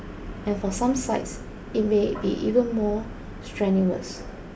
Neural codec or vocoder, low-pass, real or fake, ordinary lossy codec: none; none; real; none